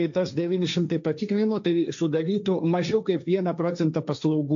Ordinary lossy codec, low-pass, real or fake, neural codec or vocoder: AAC, 64 kbps; 7.2 kHz; fake; codec, 16 kHz, 1.1 kbps, Voila-Tokenizer